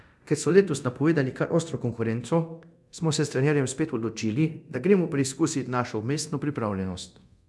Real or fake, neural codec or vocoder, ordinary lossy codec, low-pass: fake; codec, 24 kHz, 0.9 kbps, DualCodec; none; none